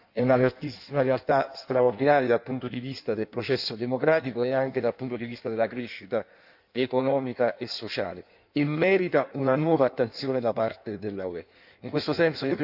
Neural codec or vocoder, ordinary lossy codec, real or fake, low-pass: codec, 16 kHz in and 24 kHz out, 1.1 kbps, FireRedTTS-2 codec; none; fake; 5.4 kHz